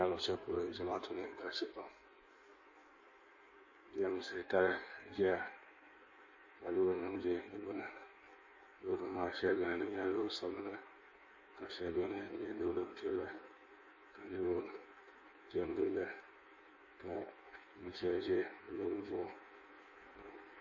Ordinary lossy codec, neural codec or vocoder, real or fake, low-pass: MP3, 32 kbps; codec, 16 kHz in and 24 kHz out, 1.1 kbps, FireRedTTS-2 codec; fake; 7.2 kHz